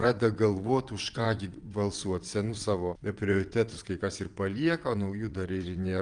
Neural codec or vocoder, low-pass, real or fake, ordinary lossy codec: vocoder, 22.05 kHz, 80 mel bands, WaveNeXt; 9.9 kHz; fake; Opus, 32 kbps